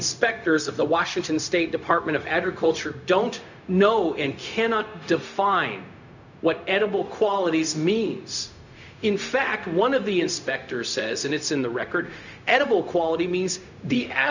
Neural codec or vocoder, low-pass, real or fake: codec, 16 kHz, 0.4 kbps, LongCat-Audio-Codec; 7.2 kHz; fake